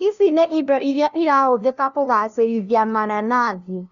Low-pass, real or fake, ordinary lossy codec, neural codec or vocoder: 7.2 kHz; fake; none; codec, 16 kHz, 0.5 kbps, FunCodec, trained on LibriTTS, 25 frames a second